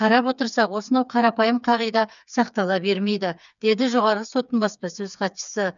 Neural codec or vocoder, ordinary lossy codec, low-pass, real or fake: codec, 16 kHz, 4 kbps, FreqCodec, smaller model; none; 7.2 kHz; fake